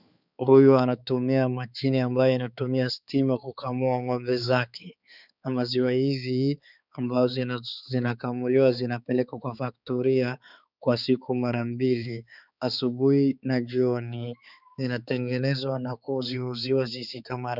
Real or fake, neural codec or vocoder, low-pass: fake; codec, 16 kHz, 4 kbps, X-Codec, HuBERT features, trained on balanced general audio; 5.4 kHz